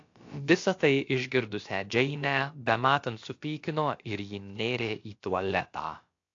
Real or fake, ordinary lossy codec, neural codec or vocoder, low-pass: fake; AAC, 48 kbps; codec, 16 kHz, about 1 kbps, DyCAST, with the encoder's durations; 7.2 kHz